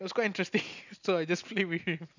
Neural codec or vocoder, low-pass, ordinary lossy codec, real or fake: none; 7.2 kHz; none; real